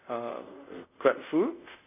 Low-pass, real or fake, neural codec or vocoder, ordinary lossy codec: 3.6 kHz; fake; codec, 24 kHz, 0.5 kbps, DualCodec; none